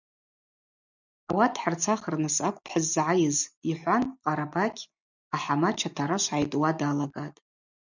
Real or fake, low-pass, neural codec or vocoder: real; 7.2 kHz; none